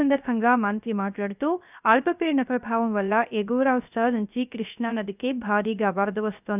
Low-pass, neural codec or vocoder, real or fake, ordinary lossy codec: 3.6 kHz; codec, 16 kHz, 0.3 kbps, FocalCodec; fake; none